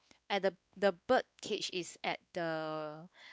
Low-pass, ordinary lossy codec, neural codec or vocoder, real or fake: none; none; codec, 16 kHz, 2 kbps, X-Codec, WavLM features, trained on Multilingual LibriSpeech; fake